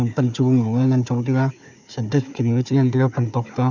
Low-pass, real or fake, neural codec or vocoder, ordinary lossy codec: 7.2 kHz; fake; codec, 16 kHz, 4 kbps, FunCodec, trained on Chinese and English, 50 frames a second; none